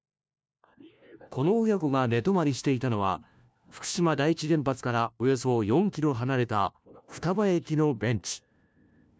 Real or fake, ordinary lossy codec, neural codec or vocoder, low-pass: fake; none; codec, 16 kHz, 1 kbps, FunCodec, trained on LibriTTS, 50 frames a second; none